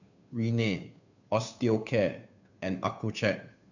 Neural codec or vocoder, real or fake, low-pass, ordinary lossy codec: codec, 16 kHz, 2 kbps, FunCodec, trained on Chinese and English, 25 frames a second; fake; 7.2 kHz; none